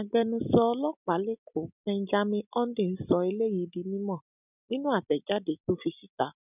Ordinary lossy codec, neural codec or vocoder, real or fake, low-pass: none; none; real; 3.6 kHz